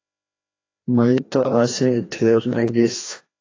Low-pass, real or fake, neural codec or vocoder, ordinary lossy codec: 7.2 kHz; fake; codec, 16 kHz, 1 kbps, FreqCodec, larger model; AAC, 32 kbps